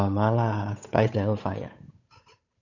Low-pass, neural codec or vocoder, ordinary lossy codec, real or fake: 7.2 kHz; codec, 16 kHz, 8 kbps, FunCodec, trained on Chinese and English, 25 frames a second; none; fake